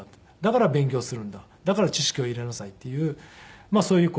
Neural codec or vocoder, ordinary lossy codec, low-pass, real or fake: none; none; none; real